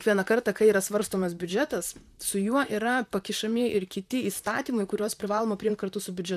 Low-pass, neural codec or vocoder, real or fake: 14.4 kHz; vocoder, 44.1 kHz, 128 mel bands, Pupu-Vocoder; fake